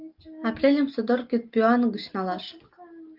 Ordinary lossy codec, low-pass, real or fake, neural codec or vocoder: Opus, 32 kbps; 5.4 kHz; real; none